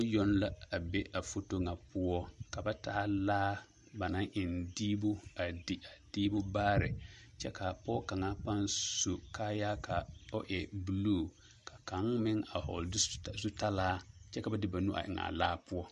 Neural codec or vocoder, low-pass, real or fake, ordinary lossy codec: none; 14.4 kHz; real; MP3, 48 kbps